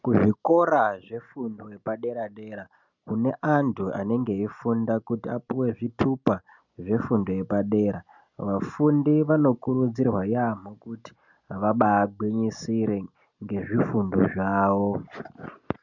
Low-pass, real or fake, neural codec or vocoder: 7.2 kHz; real; none